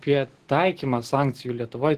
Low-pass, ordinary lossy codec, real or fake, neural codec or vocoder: 14.4 kHz; Opus, 16 kbps; real; none